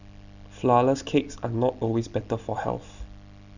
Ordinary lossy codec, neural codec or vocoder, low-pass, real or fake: none; none; 7.2 kHz; real